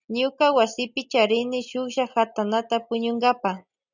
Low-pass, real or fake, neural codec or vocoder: 7.2 kHz; real; none